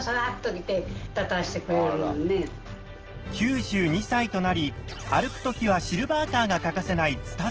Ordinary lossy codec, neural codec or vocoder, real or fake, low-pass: Opus, 16 kbps; none; real; 7.2 kHz